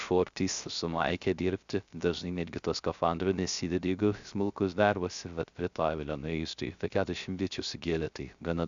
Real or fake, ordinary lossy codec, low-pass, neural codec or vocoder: fake; Opus, 64 kbps; 7.2 kHz; codec, 16 kHz, 0.3 kbps, FocalCodec